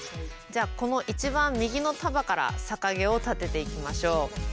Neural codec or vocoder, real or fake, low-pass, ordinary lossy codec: none; real; none; none